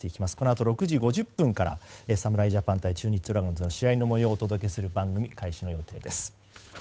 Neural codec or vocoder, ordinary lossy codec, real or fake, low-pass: codec, 16 kHz, 8 kbps, FunCodec, trained on Chinese and English, 25 frames a second; none; fake; none